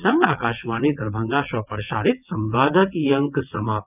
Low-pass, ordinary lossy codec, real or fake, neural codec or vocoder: 3.6 kHz; none; fake; vocoder, 22.05 kHz, 80 mel bands, WaveNeXt